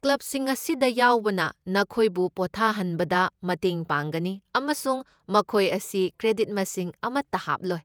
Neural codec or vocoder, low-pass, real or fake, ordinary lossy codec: vocoder, 48 kHz, 128 mel bands, Vocos; none; fake; none